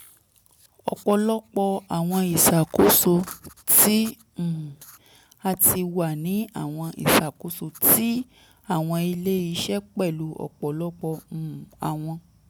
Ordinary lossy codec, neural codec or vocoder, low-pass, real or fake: none; none; none; real